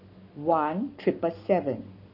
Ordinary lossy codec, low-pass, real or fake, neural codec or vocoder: none; 5.4 kHz; fake; codec, 44.1 kHz, 7.8 kbps, Pupu-Codec